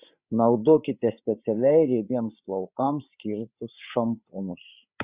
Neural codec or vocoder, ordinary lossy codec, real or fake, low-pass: codec, 16 kHz, 8 kbps, FreqCodec, larger model; Opus, 64 kbps; fake; 3.6 kHz